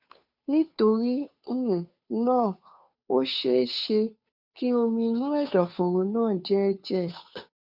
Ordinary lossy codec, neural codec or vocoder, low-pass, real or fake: none; codec, 16 kHz, 2 kbps, FunCodec, trained on Chinese and English, 25 frames a second; 5.4 kHz; fake